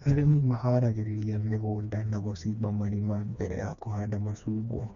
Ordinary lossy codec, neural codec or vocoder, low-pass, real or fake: none; codec, 16 kHz, 2 kbps, FreqCodec, smaller model; 7.2 kHz; fake